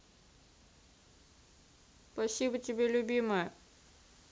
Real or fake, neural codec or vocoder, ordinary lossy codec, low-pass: real; none; none; none